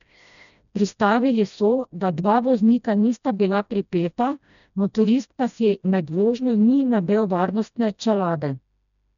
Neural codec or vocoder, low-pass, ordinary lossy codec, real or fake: codec, 16 kHz, 1 kbps, FreqCodec, smaller model; 7.2 kHz; none; fake